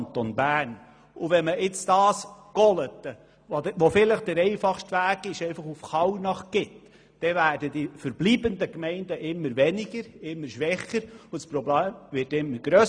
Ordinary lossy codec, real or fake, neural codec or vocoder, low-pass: none; real; none; 9.9 kHz